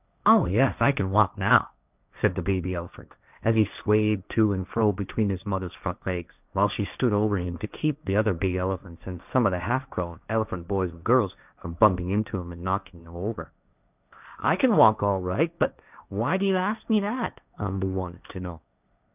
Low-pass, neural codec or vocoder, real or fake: 3.6 kHz; codec, 16 kHz, 1.1 kbps, Voila-Tokenizer; fake